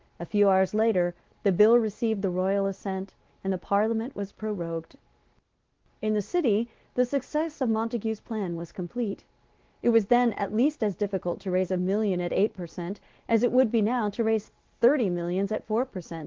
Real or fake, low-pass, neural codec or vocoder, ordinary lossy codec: real; 7.2 kHz; none; Opus, 16 kbps